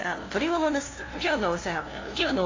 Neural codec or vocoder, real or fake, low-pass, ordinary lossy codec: codec, 16 kHz, 0.5 kbps, FunCodec, trained on LibriTTS, 25 frames a second; fake; 7.2 kHz; AAC, 32 kbps